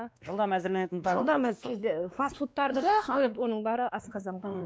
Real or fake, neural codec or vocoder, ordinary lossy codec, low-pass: fake; codec, 16 kHz, 2 kbps, X-Codec, WavLM features, trained on Multilingual LibriSpeech; none; none